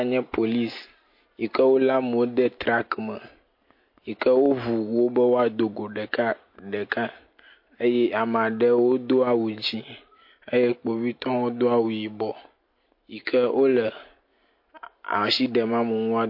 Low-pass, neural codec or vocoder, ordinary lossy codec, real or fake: 5.4 kHz; none; MP3, 32 kbps; real